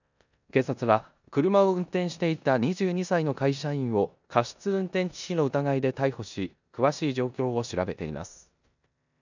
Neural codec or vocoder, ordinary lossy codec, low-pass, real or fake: codec, 16 kHz in and 24 kHz out, 0.9 kbps, LongCat-Audio-Codec, four codebook decoder; none; 7.2 kHz; fake